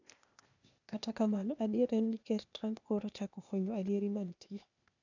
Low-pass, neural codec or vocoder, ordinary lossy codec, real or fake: 7.2 kHz; codec, 16 kHz, 0.8 kbps, ZipCodec; none; fake